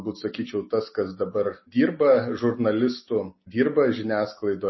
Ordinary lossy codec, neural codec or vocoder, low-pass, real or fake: MP3, 24 kbps; none; 7.2 kHz; real